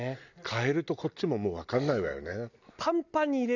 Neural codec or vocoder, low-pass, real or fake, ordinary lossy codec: none; 7.2 kHz; real; none